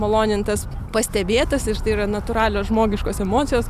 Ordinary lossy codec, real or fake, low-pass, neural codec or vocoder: Opus, 64 kbps; real; 14.4 kHz; none